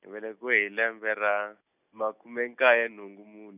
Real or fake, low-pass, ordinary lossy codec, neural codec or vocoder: real; 3.6 kHz; none; none